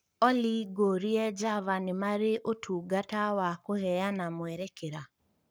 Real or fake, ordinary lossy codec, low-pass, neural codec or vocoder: fake; none; none; codec, 44.1 kHz, 7.8 kbps, Pupu-Codec